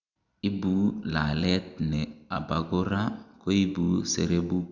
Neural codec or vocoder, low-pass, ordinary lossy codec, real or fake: none; 7.2 kHz; none; real